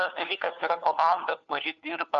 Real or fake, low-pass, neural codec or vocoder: fake; 7.2 kHz; codec, 16 kHz, 4 kbps, FunCodec, trained on LibriTTS, 50 frames a second